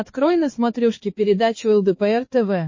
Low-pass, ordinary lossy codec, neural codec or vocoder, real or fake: 7.2 kHz; MP3, 32 kbps; codec, 16 kHz, 4 kbps, X-Codec, HuBERT features, trained on balanced general audio; fake